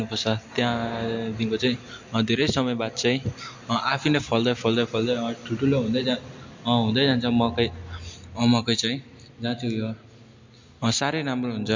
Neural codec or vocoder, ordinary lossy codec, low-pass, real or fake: none; MP3, 48 kbps; 7.2 kHz; real